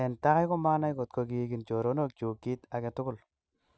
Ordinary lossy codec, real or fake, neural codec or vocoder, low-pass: none; real; none; none